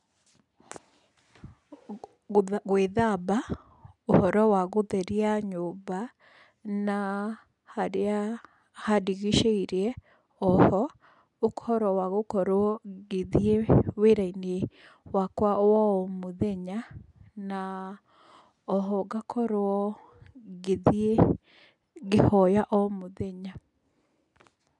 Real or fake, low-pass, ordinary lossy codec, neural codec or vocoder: real; 10.8 kHz; none; none